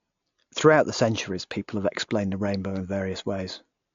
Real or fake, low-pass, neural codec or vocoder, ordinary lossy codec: real; 7.2 kHz; none; AAC, 48 kbps